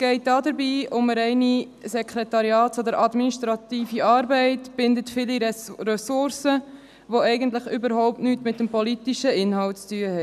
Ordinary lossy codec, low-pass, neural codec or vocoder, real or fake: none; 14.4 kHz; none; real